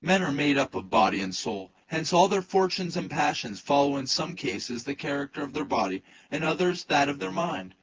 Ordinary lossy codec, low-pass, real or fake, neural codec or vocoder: Opus, 16 kbps; 7.2 kHz; fake; vocoder, 24 kHz, 100 mel bands, Vocos